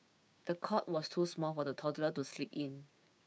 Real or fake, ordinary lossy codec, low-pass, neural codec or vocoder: fake; none; none; codec, 16 kHz, 6 kbps, DAC